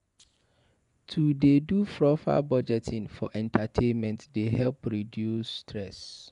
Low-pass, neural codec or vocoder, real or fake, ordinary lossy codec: 10.8 kHz; vocoder, 24 kHz, 100 mel bands, Vocos; fake; none